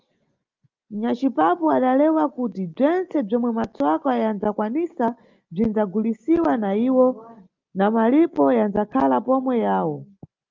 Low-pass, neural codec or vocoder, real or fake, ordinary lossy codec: 7.2 kHz; none; real; Opus, 32 kbps